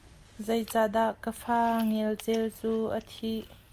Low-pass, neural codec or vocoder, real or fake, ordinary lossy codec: 14.4 kHz; none; real; Opus, 32 kbps